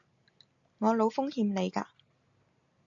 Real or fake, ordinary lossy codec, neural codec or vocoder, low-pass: real; AAC, 64 kbps; none; 7.2 kHz